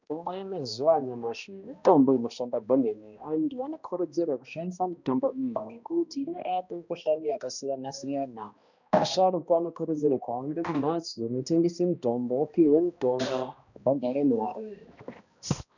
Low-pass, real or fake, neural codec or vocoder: 7.2 kHz; fake; codec, 16 kHz, 1 kbps, X-Codec, HuBERT features, trained on balanced general audio